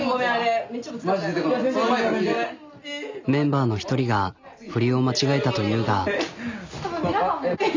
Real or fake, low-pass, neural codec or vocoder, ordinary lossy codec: real; 7.2 kHz; none; MP3, 64 kbps